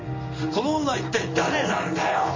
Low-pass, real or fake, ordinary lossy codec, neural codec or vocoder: 7.2 kHz; fake; MP3, 48 kbps; codec, 16 kHz in and 24 kHz out, 1 kbps, XY-Tokenizer